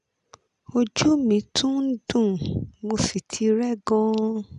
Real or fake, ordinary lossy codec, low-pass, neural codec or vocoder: real; none; 10.8 kHz; none